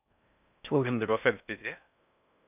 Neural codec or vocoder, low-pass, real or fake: codec, 16 kHz in and 24 kHz out, 0.6 kbps, FocalCodec, streaming, 4096 codes; 3.6 kHz; fake